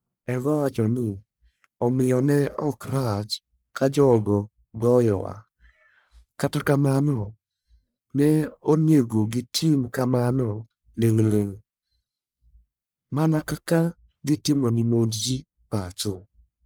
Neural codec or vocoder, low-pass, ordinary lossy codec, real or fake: codec, 44.1 kHz, 1.7 kbps, Pupu-Codec; none; none; fake